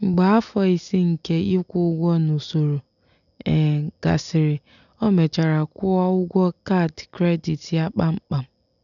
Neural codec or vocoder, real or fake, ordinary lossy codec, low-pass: none; real; none; 7.2 kHz